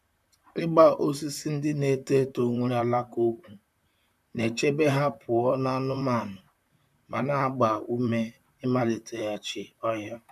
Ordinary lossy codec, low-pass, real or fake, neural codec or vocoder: none; 14.4 kHz; fake; vocoder, 44.1 kHz, 128 mel bands, Pupu-Vocoder